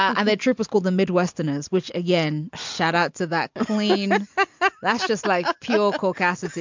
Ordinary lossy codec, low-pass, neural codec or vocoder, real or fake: MP3, 64 kbps; 7.2 kHz; none; real